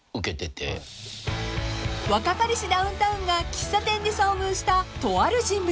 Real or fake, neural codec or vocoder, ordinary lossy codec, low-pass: real; none; none; none